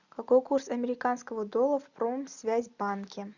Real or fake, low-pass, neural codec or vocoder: real; 7.2 kHz; none